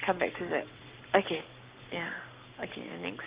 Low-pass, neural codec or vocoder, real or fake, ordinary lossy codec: 3.6 kHz; codec, 44.1 kHz, 7.8 kbps, Pupu-Codec; fake; Opus, 32 kbps